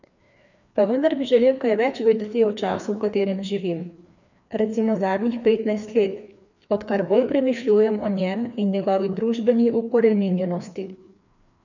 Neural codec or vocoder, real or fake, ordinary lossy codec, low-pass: codec, 16 kHz, 2 kbps, FreqCodec, larger model; fake; none; 7.2 kHz